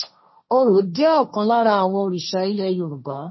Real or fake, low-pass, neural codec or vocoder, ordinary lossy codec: fake; 7.2 kHz; codec, 16 kHz, 1.1 kbps, Voila-Tokenizer; MP3, 24 kbps